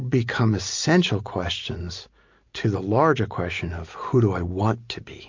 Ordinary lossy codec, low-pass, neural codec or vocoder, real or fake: MP3, 48 kbps; 7.2 kHz; none; real